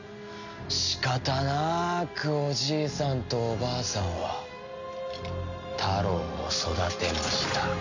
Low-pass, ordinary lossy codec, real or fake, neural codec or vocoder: 7.2 kHz; none; real; none